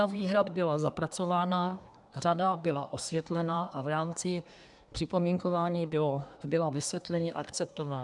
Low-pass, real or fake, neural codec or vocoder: 10.8 kHz; fake; codec, 24 kHz, 1 kbps, SNAC